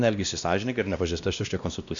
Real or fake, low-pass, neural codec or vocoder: fake; 7.2 kHz; codec, 16 kHz, 1 kbps, X-Codec, WavLM features, trained on Multilingual LibriSpeech